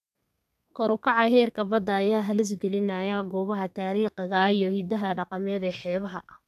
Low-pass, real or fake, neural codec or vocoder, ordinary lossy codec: 14.4 kHz; fake; codec, 32 kHz, 1.9 kbps, SNAC; none